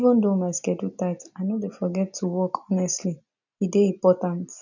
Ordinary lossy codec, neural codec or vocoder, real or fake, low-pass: none; none; real; 7.2 kHz